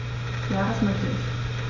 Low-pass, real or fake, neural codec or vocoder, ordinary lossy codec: 7.2 kHz; real; none; Opus, 64 kbps